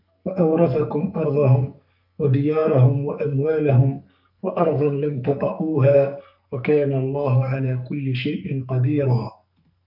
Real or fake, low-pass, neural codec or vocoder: fake; 5.4 kHz; codec, 44.1 kHz, 2.6 kbps, SNAC